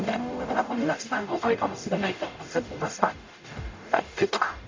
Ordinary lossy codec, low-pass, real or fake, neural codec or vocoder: MP3, 64 kbps; 7.2 kHz; fake; codec, 44.1 kHz, 0.9 kbps, DAC